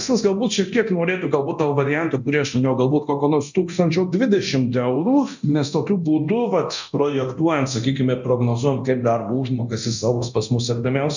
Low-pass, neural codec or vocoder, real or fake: 7.2 kHz; codec, 24 kHz, 0.9 kbps, DualCodec; fake